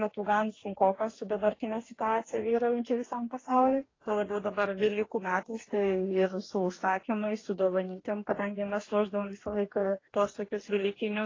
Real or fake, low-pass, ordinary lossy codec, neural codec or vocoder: fake; 7.2 kHz; AAC, 32 kbps; codec, 44.1 kHz, 2.6 kbps, DAC